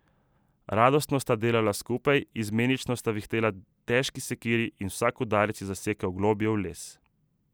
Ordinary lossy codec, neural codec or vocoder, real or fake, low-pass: none; none; real; none